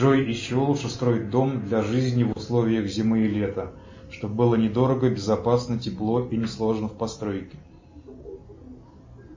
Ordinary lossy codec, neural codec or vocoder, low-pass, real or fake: MP3, 32 kbps; none; 7.2 kHz; real